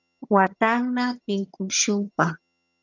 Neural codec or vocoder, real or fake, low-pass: vocoder, 22.05 kHz, 80 mel bands, HiFi-GAN; fake; 7.2 kHz